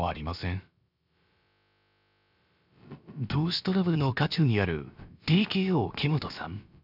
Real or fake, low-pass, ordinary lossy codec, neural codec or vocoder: fake; 5.4 kHz; none; codec, 16 kHz, about 1 kbps, DyCAST, with the encoder's durations